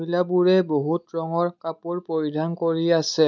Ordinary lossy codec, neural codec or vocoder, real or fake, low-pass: none; none; real; 7.2 kHz